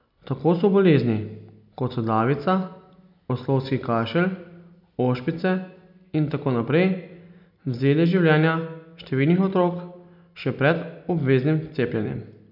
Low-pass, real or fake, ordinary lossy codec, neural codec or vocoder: 5.4 kHz; real; none; none